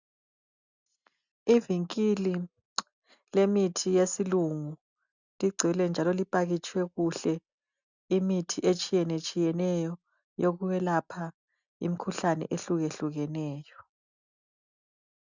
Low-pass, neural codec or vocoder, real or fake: 7.2 kHz; none; real